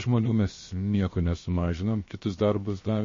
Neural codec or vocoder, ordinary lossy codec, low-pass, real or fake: codec, 16 kHz, 0.7 kbps, FocalCodec; MP3, 32 kbps; 7.2 kHz; fake